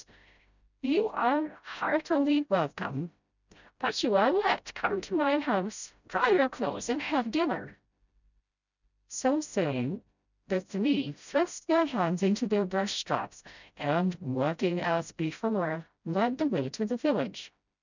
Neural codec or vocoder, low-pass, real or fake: codec, 16 kHz, 0.5 kbps, FreqCodec, smaller model; 7.2 kHz; fake